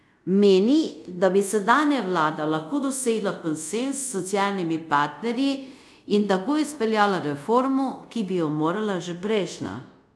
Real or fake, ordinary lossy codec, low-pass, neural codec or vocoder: fake; none; none; codec, 24 kHz, 0.5 kbps, DualCodec